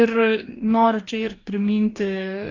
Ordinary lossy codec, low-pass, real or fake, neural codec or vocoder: AAC, 32 kbps; 7.2 kHz; fake; codec, 44.1 kHz, 2.6 kbps, DAC